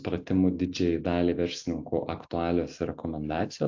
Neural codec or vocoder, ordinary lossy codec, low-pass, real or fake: none; AAC, 48 kbps; 7.2 kHz; real